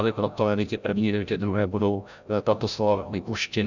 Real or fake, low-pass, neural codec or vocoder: fake; 7.2 kHz; codec, 16 kHz, 0.5 kbps, FreqCodec, larger model